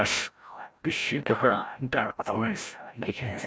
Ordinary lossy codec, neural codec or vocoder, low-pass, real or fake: none; codec, 16 kHz, 0.5 kbps, FreqCodec, larger model; none; fake